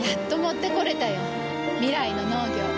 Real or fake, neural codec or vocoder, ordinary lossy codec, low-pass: real; none; none; none